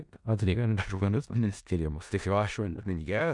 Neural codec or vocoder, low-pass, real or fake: codec, 16 kHz in and 24 kHz out, 0.4 kbps, LongCat-Audio-Codec, four codebook decoder; 10.8 kHz; fake